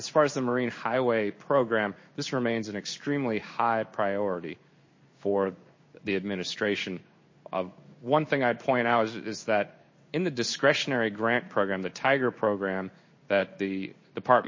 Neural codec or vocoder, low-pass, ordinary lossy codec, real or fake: codec, 16 kHz in and 24 kHz out, 1 kbps, XY-Tokenizer; 7.2 kHz; MP3, 32 kbps; fake